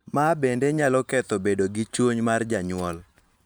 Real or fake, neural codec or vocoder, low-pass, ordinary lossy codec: fake; vocoder, 44.1 kHz, 128 mel bands every 512 samples, BigVGAN v2; none; none